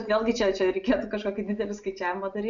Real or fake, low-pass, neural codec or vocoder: real; 7.2 kHz; none